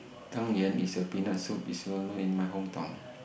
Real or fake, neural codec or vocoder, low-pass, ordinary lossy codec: real; none; none; none